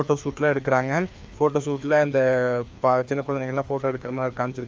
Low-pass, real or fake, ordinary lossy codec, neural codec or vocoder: none; fake; none; codec, 16 kHz, 2 kbps, FreqCodec, larger model